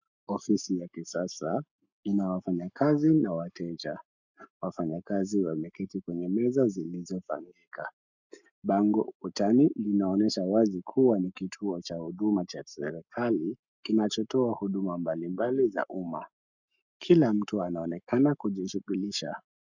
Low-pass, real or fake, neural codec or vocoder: 7.2 kHz; fake; codec, 44.1 kHz, 7.8 kbps, Pupu-Codec